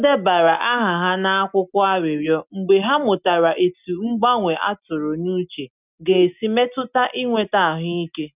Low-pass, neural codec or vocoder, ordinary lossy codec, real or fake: 3.6 kHz; none; none; real